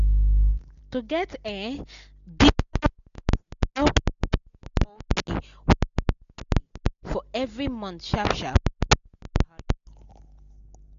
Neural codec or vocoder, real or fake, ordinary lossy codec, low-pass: none; real; MP3, 96 kbps; 7.2 kHz